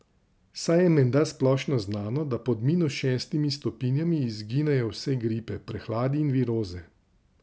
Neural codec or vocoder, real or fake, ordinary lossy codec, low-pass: none; real; none; none